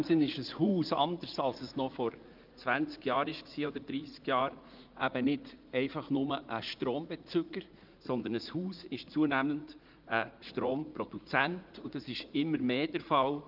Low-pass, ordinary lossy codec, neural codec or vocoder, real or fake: 5.4 kHz; Opus, 24 kbps; vocoder, 44.1 kHz, 80 mel bands, Vocos; fake